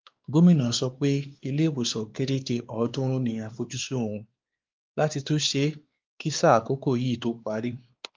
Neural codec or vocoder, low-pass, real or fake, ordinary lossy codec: codec, 16 kHz, 2 kbps, X-Codec, WavLM features, trained on Multilingual LibriSpeech; 7.2 kHz; fake; Opus, 32 kbps